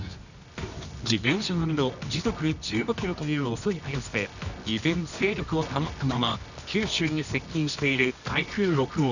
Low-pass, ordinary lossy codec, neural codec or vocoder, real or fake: 7.2 kHz; none; codec, 24 kHz, 0.9 kbps, WavTokenizer, medium music audio release; fake